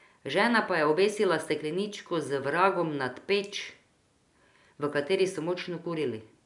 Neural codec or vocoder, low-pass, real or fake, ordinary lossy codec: none; 10.8 kHz; real; none